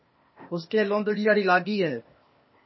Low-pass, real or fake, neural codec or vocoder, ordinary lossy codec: 7.2 kHz; fake; codec, 16 kHz, 0.8 kbps, ZipCodec; MP3, 24 kbps